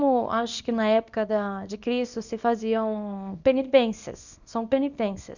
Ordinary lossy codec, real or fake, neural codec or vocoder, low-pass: none; fake; codec, 24 kHz, 0.9 kbps, WavTokenizer, small release; 7.2 kHz